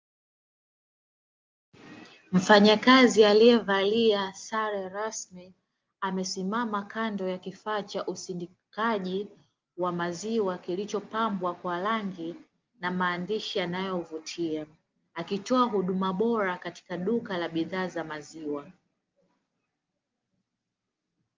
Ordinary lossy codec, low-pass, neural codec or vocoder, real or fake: Opus, 24 kbps; 7.2 kHz; none; real